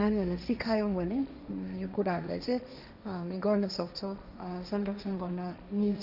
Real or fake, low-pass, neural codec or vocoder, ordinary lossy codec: fake; 5.4 kHz; codec, 16 kHz, 1.1 kbps, Voila-Tokenizer; none